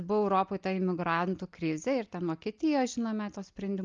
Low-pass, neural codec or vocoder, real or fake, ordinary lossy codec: 7.2 kHz; none; real; Opus, 24 kbps